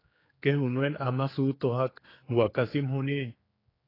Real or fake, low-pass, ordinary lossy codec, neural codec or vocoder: fake; 5.4 kHz; AAC, 24 kbps; codec, 16 kHz, 4 kbps, X-Codec, HuBERT features, trained on general audio